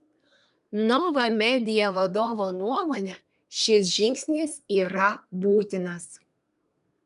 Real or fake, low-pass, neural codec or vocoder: fake; 10.8 kHz; codec, 24 kHz, 1 kbps, SNAC